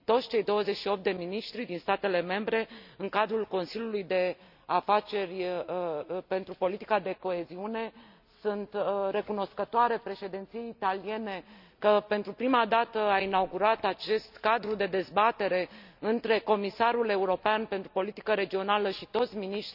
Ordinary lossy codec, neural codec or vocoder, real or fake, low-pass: none; none; real; 5.4 kHz